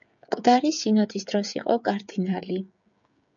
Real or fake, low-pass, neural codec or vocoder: fake; 7.2 kHz; codec, 16 kHz, 16 kbps, FreqCodec, smaller model